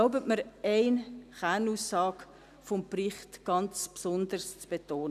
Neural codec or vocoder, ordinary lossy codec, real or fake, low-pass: none; none; real; 14.4 kHz